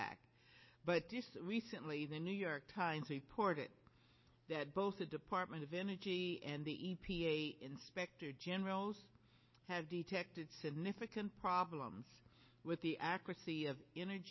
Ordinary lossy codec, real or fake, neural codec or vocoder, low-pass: MP3, 24 kbps; real; none; 7.2 kHz